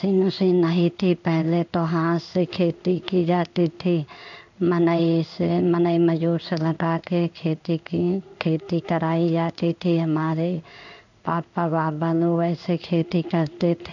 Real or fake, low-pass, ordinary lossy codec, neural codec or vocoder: fake; 7.2 kHz; none; codec, 16 kHz in and 24 kHz out, 1 kbps, XY-Tokenizer